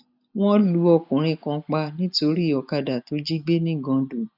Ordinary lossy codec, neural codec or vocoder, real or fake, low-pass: none; none; real; 5.4 kHz